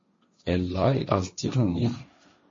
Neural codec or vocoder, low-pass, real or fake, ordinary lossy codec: codec, 16 kHz, 1.1 kbps, Voila-Tokenizer; 7.2 kHz; fake; MP3, 32 kbps